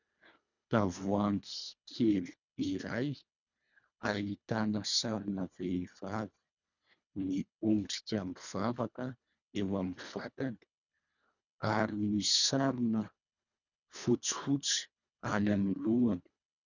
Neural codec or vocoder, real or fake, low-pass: codec, 24 kHz, 1.5 kbps, HILCodec; fake; 7.2 kHz